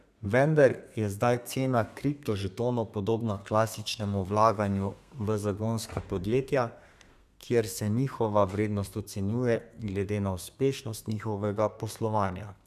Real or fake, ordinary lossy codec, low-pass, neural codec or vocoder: fake; none; 14.4 kHz; codec, 32 kHz, 1.9 kbps, SNAC